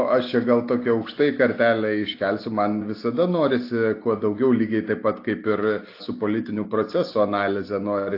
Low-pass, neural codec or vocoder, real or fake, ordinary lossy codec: 5.4 kHz; none; real; AAC, 32 kbps